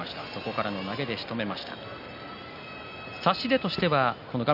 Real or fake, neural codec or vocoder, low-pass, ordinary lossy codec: real; none; 5.4 kHz; none